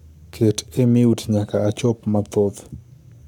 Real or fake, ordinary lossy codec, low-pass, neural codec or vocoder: fake; none; 19.8 kHz; codec, 44.1 kHz, 7.8 kbps, Pupu-Codec